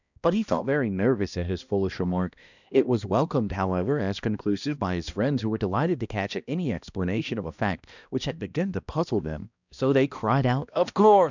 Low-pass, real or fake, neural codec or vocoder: 7.2 kHz; fake; codec, 16 kHz, 1 kbps, X-Codec, HuBERT features, trained on balanced general audio